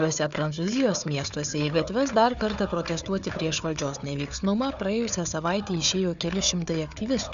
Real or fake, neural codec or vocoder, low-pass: fake; codec, 16 kHz, 4 kbps, FunCodec, trained on Chinese and English, 50 frames a second; 7.2 kHz